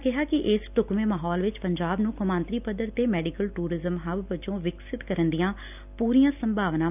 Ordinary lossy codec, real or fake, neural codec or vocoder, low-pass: none; real; none; 3.6 kHz